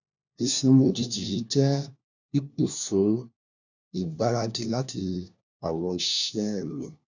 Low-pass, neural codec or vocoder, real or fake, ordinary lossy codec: 7.2 kHz; codec, 16 kHz, 1 kbps, FunCodec, trained on LibriTTS, 50 frames a second; fake; none